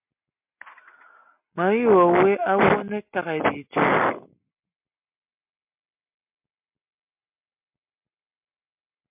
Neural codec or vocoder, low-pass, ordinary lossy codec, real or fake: none; 3.6 kHz; MP3, 32 kbps; real